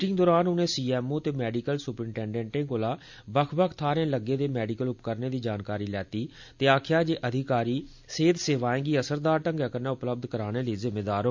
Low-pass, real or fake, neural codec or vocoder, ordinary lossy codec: 7.2 kHz; real; none; MP3, 64 kbps